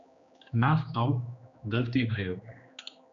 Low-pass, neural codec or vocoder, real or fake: 7.2 kHz; codec, 16 kHz, 2 kbps, X-Codec, HuBERT features, trained on general audio; fake